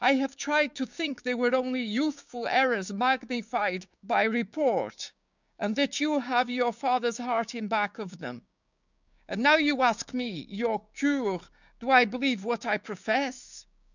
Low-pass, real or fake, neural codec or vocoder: 7.2 kHz; fake; codec, 16 kHz, 6 kbps, DAC